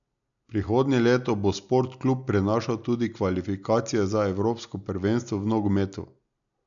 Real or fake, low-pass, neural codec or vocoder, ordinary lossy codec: real; 7.2 kHz; none; none